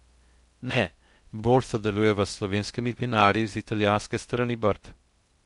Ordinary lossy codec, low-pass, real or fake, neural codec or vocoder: MP3, 64 kbps; 10.8 kHz; fake; codec, 16 kHz in and 24 kHz out, 0.6 kbps, FocalCodec, streaming, 2048 codes